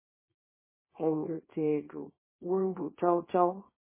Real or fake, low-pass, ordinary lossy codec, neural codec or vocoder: fake; 3.6 kHz; MP3, 16 kbps; codec, 24 kHz, 0.9 kbps, WavTokenizer, small release